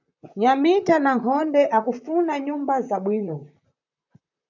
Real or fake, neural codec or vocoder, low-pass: fake; vocoder, 44.1 kHz, 128 mel bands, Pupu-Vocoder; 7.2 kHz